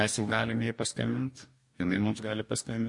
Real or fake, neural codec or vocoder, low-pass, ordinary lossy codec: fake; codec, 44.1 kHz, 2.6 kbps, DAC; 10.8 kHz; MP3, 48 kbps